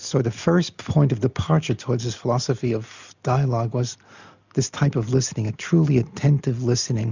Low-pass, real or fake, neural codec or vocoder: 7.2 kHz; real; none